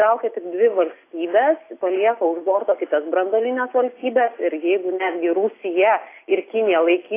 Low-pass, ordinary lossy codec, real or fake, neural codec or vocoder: 3.6 kHz; AAC, 24 kbps; real; none